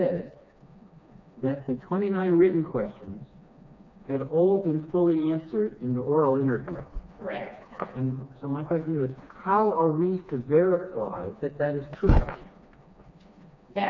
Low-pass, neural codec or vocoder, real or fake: 7.2 kHz; codec, 16 kHz, 2 kbps, FreqCodec, smaller model; fake